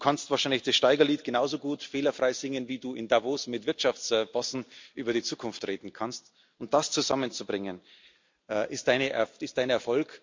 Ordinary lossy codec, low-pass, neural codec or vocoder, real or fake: MP3, 64 kbps; 7.2 kHz; none; real